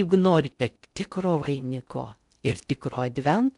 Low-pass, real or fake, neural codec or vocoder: 10.8 kHz; fake; codec, 16 kHz in and 24 kHz out, 0.6 kbps, FocalCodec, streaming, 2048 codes